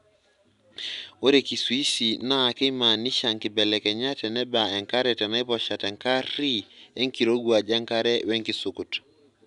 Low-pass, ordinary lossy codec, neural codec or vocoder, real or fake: 10.8 kHz; none; none; real